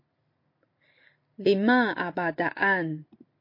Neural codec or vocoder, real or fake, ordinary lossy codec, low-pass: none; real; MP3, 32 kbps; 5.4 kHz